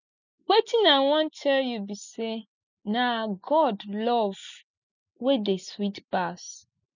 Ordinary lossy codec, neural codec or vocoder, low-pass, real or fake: none; none; 7.2 kHz; real